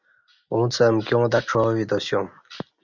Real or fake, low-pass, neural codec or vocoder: real; 7.2 kHz; none